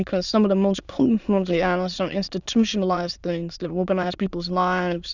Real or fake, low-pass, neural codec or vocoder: fake; 7.2 kHz; autoencoder, 22.05 kHz, a latent of 192 numbers a frame, VITS, trained on many speakers